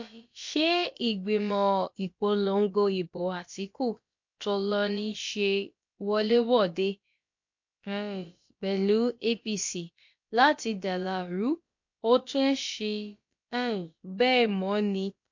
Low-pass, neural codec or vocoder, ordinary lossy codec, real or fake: 7.2 kHz; codec, 16 kHz, about 1 kbps, DyCAST, with the encoder's durations; MP3, 48 kbps; fake